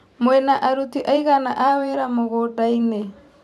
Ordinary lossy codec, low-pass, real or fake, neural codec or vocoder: none; 14.4 kHz; fake; vocoder, 44.1 kHz, 128 mel bands every 512 samples, BigVGAN v2